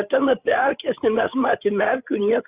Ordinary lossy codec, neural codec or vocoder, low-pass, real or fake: Opus, 64 kbps; codec, 16 kHz, 8 kbps, FreqCodec, larger model; 3.6 kHz; fake